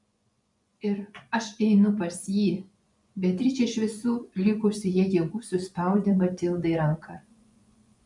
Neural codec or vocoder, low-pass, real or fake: vocoder, 24 kHz, 100 mel bands, Vocos; 10.8 kHz; fake